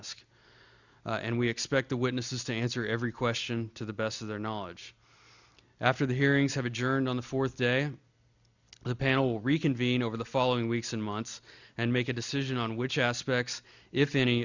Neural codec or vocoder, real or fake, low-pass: none; real; 7.2 kHz